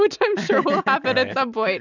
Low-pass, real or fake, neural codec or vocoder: 7.2 kHz; real; none